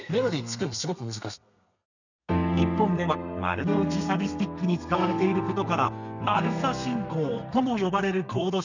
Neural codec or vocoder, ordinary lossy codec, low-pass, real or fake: codec, 32 kHz, 1.9 kbps, SNAC; none; 7.2 kHz; fake